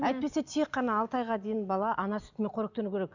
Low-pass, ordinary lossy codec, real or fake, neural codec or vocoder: 7.2 kHz; none; real; none